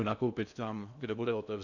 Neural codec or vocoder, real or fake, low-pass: codec, 16 kHz in and 24 kHz out, 0.8 kbps, FocalCodec, streaming, 65536 codes; fake; 7.2 kHz